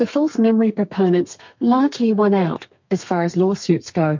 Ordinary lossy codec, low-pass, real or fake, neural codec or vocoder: MP3, 48 kbps; 7.2 kHz; fake; codec, 32 kHz, 1.9 kbps, SNAC